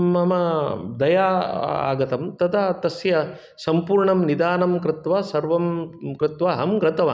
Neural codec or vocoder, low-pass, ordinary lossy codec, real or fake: none; none; none; real